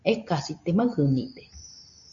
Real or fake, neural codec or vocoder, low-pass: real; none; 7.2 kHz